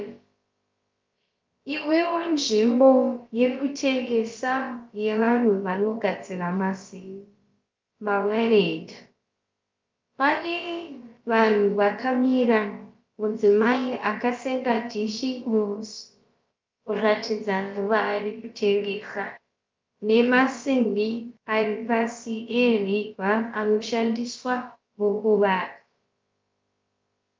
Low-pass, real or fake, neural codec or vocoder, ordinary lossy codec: 7.2 kHz; fake; codec, 16 kHz, about 1 kbps, DyCAST, with the encoder's durations; Opus, 32 kbps